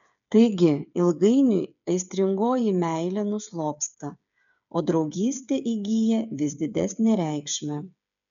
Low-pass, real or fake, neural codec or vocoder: 7.2 kHz; fake; codec, 16 kHz, 8 kbps, FreqCodec, smaller model